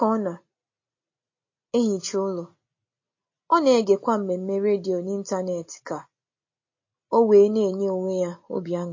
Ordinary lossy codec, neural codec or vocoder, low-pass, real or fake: MP3, 32 kbps; none; 7.2 kHz; real